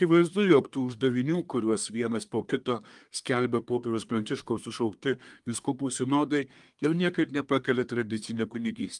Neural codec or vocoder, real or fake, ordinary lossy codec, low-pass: codec, 24 kHz, 1 kbps, SNAC; fake; Opus, 32 kbps; 10.8 kHz